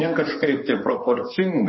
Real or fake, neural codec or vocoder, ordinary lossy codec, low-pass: fake; codec, 16 kHz in and 24 kHz out, 2.2 kbps, FireRedTTS-2 codec; MP3, 24 kbps; 7.2 kHz